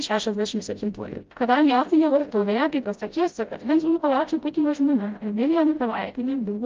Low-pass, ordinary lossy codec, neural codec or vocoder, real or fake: 7.2 kHz; Opus, 32 kbps; codec, 16 kHz, 0.5 kbps, FreqCodec, smaller model; fake